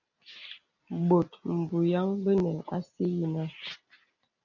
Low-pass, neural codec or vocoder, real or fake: 7.2 kHz; none; real